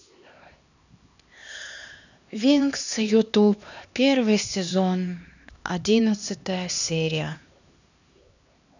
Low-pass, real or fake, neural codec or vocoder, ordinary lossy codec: 7.2 kHz; fake; codec, 16 kHz, 2 kbps, X-Codec, HuBERT features, trained on LibriSpeech; none